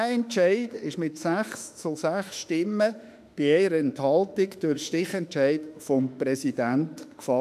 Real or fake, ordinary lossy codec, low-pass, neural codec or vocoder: fake; none; 14.4 kHz; autoencoder, 48 kHz, 32 numbers a frame, DAC-VAE, trained on Japanese speech